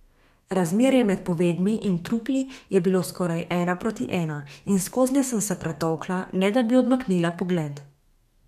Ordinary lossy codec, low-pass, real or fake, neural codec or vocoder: none; 14.4 kHz; fake; codec, 32 kHz, 1.9 kbps, SNAC